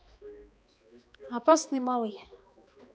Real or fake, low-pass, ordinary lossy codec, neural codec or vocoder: fake; none; none; codec, 16 kHz, 2 kbps, X-Codec, HuBERT features, trained on balanced general audio